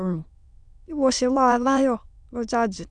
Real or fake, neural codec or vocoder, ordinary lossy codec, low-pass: fake; autoencoder, 22.05 kHz, a latent of 192 numbers a frame, VITS, trained on many speakers; none; 9.9 kHz